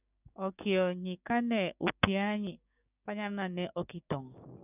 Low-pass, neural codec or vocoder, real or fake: 3.6 kHz; codec, 16 kHz, 6 kbps, DAC; fake